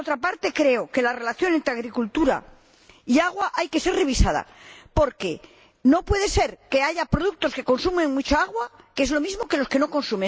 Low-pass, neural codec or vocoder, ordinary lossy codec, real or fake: none; none; none; real